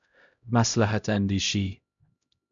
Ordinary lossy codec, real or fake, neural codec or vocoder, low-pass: MP3, 64 kbps; fake; codec, 16 kHz, 0.5 kbps, X-Codec, HuBERT features, trained on LibriSpeech; 7.2 kHz